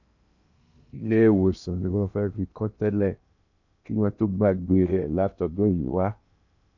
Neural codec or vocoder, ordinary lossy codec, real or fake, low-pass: codec, 16 kHz in and 24 kHz out, 0.8 kbps, FocalCodec, streaming, 65536 codes; none; fake; 7.2 kHz